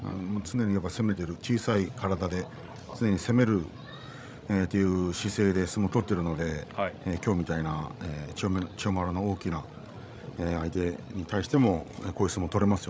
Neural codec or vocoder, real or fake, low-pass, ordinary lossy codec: codec, 16 kHz, 16 kbps, FreqCodec, larger model; fake; none; none